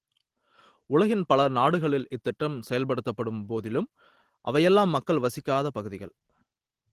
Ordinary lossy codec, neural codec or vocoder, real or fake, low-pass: Opus, 16 kbps; none; real; 14.4 kHz